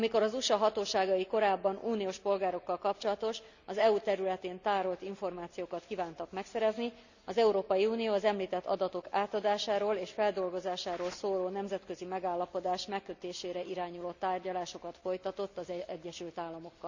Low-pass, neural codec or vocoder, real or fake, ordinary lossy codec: 7.2 kHz; none; real; none